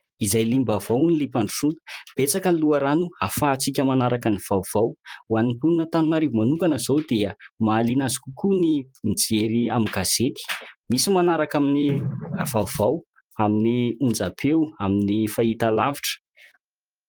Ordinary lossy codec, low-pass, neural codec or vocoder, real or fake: Opus, 32 kbps; 19.8 kHz; vocoder, 44.1 kHz, 128 mel bands, Pupu-Vocoder; fake